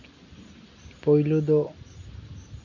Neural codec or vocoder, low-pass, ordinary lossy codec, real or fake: none; 7.2 kHz; none; real